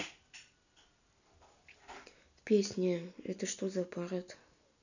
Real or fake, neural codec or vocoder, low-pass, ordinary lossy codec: real; none; 7.2 kHz; none